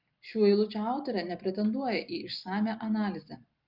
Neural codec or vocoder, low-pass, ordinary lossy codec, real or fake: none; 5.4 kHz; Opus, 24 kbps; real